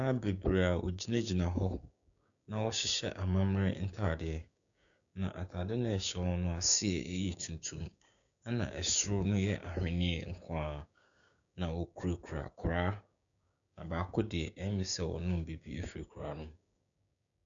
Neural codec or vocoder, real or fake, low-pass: codec, 16 kHz, 6 kbps, DAC; fake; 7.2 kHz